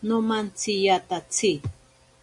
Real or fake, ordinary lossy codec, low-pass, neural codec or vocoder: real; MP3, 64 kbps; 10.8 kHz; none